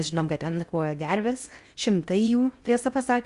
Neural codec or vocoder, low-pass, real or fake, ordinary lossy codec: codec, 16 kHz in and 24 kHz out, 0.6 kbps, FocalCodec, streaming, 4096 codes; 10.8 kHz; fake; Opus, 64 kbps